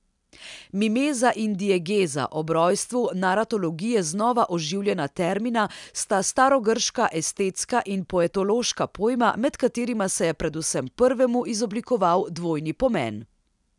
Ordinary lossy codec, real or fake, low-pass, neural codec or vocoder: none; real; 10.8 kHz; none